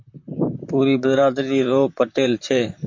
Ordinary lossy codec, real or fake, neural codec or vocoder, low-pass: MP3, 48 kbps; fake; vocoder, 44.1 kHz, 128 mel bands, Pupu-Vocoder; 7.2 kHz